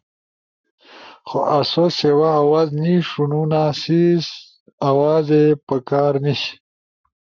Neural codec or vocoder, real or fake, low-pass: codec, 44.1 kHz, 7.8 kbps, Pupu-Codec; fake; 7.2 kHz